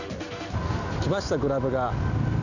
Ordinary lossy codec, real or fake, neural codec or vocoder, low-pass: none; real; none; 7.2 kHz